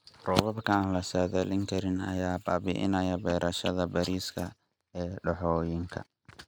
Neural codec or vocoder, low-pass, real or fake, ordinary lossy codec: none; none; real; none